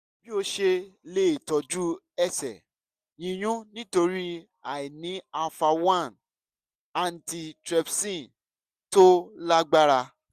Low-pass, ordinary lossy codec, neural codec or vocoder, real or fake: 14.4 kHz; Opus, 64 kbps; none; real